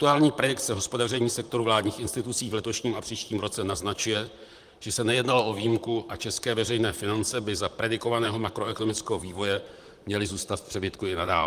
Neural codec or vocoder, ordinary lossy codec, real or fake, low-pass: vocoder, 44.1 kHz, 128 mel bands, Pupu-Vocoder; Opus, 32 kbps; fake; 14.4 kHz